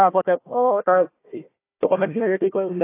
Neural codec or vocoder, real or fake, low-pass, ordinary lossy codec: codec, 16 kHz, 1 kbps, FunCodec, trained on Chinese and English, 50 frames a second; fake; 3.6 kHz; AAC, 24 kbps